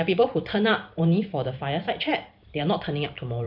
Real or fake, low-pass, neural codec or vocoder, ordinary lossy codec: fake; 5.4 kHz; vocoder, 44.1 kHz, 128 mel bands every 256 samples, BigVGAN v2; none